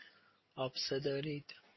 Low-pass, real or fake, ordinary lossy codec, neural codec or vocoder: 7.2 kHz; real; MP3, 24 kbps; none